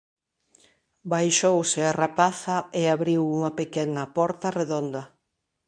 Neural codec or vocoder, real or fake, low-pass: codec, 24 kHz, 0.9 kbps, WavTokenizer, medium speech release version 2; fake; 9.9 kHz